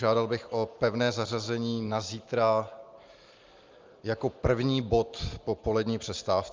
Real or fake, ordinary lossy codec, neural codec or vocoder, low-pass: real; Opus, 24 kbps; none; 7.2 kHz